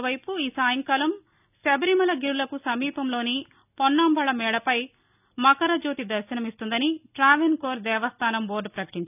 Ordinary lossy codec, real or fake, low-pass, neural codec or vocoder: none; real; 3.6 kHz; none